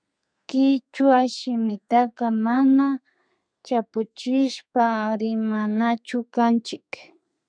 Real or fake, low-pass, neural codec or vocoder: fake; 9.9 kHz; codec, 32 kHz, 1.9 kbps, SNAC